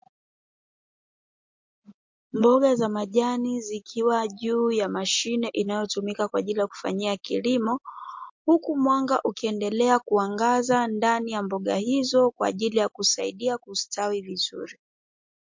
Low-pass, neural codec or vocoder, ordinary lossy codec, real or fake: 7.2 kHz; none; MP3, 48 kbps; real